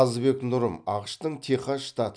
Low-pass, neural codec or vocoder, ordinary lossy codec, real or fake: 9.9 kHz; none; MP3, 96 kbps; real